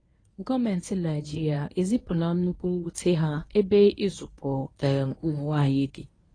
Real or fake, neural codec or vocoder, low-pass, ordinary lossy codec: fake; codec, 24 kHz, 0.9 kbps, WavTokenizer, medium speech release version 1; 9.9 kHz; AAC, 32 kbps